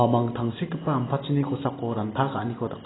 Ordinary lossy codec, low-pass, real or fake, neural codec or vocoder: AAC, 16 kbps; 7.2 kHz; real; none